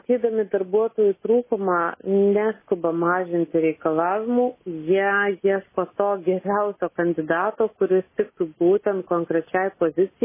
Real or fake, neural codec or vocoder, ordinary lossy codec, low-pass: real; none; MP3, 16 kbps; 3.6 kHz